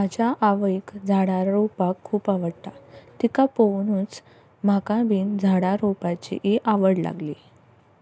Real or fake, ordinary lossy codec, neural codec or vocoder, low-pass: real; none; none; none